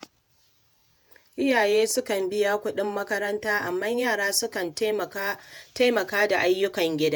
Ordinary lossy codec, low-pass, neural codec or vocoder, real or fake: none; none; vocoder, 48 kHz, 128 mel bands, Vocos; fake